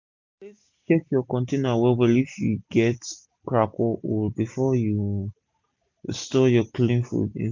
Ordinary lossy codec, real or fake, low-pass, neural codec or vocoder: MP3, 64 kbps; real; 7.2 kHz; none